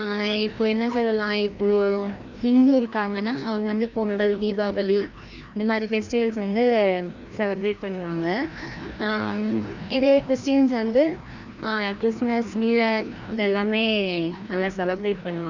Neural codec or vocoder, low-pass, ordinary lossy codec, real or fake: codec, 16 kHz, 1 kbps, FreqCodec, larger model; 7.2 kHz; Opus, 64 kbps; fake